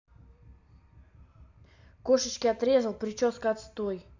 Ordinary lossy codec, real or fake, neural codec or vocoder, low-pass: AAC, 48 kbps; real; none; 7.2 kHz